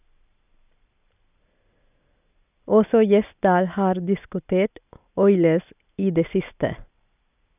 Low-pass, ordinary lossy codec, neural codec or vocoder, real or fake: 3.6 kHz; none; none; real